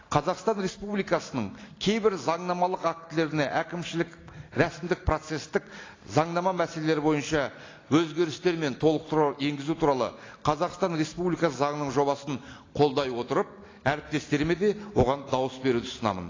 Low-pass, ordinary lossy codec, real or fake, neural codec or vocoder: 7.2 kHz; AAC, 32 kbps; real; none